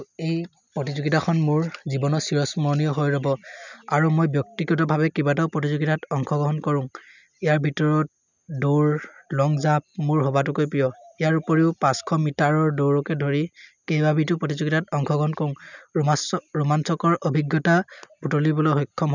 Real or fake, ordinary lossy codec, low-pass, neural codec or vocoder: real; none; 7.2 kHz; none